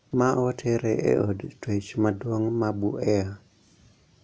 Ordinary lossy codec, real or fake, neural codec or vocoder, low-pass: none; real; none; none